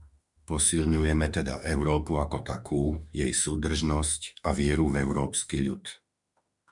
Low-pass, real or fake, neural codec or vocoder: 10.8 kHz; fake; autoencoder, 48 kHz, 32 numbers a frame, DAC-VAE, trained on Japanese speech